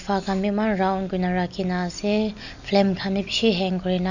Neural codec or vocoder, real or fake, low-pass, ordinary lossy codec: none; real; 7.2 kHz; none